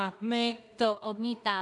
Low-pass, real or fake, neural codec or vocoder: 10.8 kHz; fake; codec, 16 kHz in and 24 kHz out, 0.4 kbps, LongCat-Audio-Codec, two codebook decoder